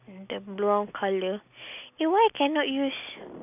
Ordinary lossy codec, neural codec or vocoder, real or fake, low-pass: none; none; real; 3.6 kHz